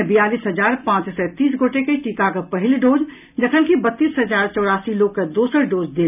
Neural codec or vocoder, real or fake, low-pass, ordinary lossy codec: none; real; 3.6 kHz; none